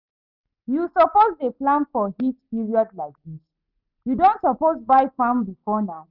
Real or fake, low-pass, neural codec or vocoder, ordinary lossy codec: real; 5.4 kHz; none; none